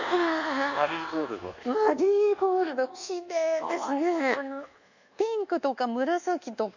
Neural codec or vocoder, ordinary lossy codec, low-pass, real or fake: codec, 24 kHz, 1.2 kbps, DualCodec; none; 7.2 kHz; fake